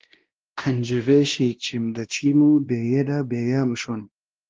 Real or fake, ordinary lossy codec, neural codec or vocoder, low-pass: fake; Opus, 16 kbps; codec, 16 kHz, 1 kbps, X-Codec, WavLM features, trained on Multilingual LibriSpeech; 7.2 kHz